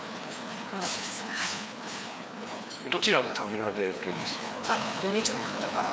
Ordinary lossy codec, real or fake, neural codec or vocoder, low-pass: none; fake; codec, 16 kHz, 2 kbps, FreqCodec, larger model; none